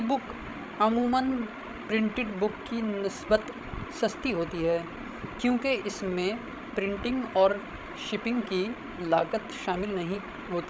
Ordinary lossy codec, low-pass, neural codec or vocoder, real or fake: none; none; codec, 16 kHz, 16 kbps, FreqCodec, larger model; fake